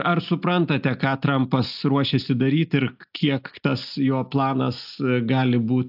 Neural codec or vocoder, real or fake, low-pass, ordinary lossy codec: none; real; 5.4 kHz; AAC, 48 kbps